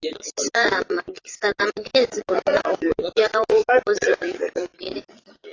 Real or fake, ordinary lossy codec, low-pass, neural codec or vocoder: fake; AAC, 32 kbps; 7.2 kHz; vocoder, 22.05 kHz, 80 mel bands, Vocos